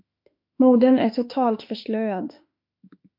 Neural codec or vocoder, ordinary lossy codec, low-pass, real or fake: autoencoder, 48 kHz, 32 numbers a frame, DAC-VAE, trained on Japanese speech; MP3, 48 kbps; 5.4 kHz; fake